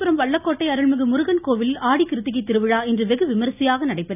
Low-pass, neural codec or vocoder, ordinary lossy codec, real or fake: 3.6 kHz; none; none; real